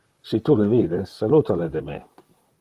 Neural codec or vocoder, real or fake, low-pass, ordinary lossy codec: vocoder, 44.1 kHz, 128 mel bands, Pupu-Vocoder; fake; 14.4 kHz; Opus, 24 kbps